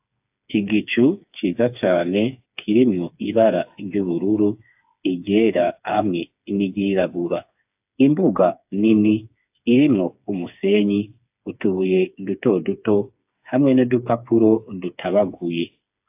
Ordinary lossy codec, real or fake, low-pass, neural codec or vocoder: AAC, 32 kbps; fake; 3.6 kHz; codec, 16 kHz, 4 kbps, FreqCodec, smaller model